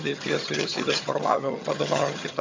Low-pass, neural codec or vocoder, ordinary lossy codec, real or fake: 7.2 kHz; vocoder, 22.05 kHz, 80 mel bands, HiFi-GAN; MP3, 64 kbps; fake